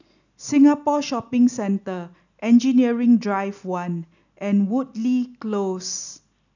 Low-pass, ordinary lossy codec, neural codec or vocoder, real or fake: 7.2 kHz; none; none; real